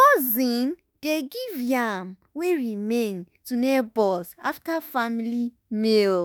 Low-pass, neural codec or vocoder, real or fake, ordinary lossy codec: none; autoencoder, 48 kHz, 32 numbers a frame, DAC-VAE, trained on Japanese speech; fake; none